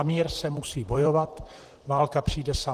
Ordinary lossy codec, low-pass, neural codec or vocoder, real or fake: Opus, 16 kbps; 14.4 kHz; vocoder, 44.1 kHz, 128 mel bands, Pupu-Vocoder; fake